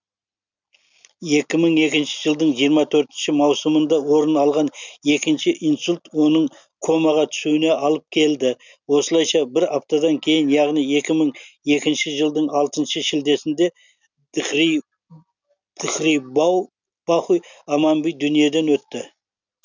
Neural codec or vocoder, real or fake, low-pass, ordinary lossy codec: none; real; 7.2 kHz; none